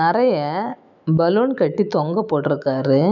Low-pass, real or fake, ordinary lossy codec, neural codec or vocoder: 7.2 kHz; fake; none; autoencoder, 48 kHz, 128 numbers a frame, DAC-VAE, trained on Japanese speech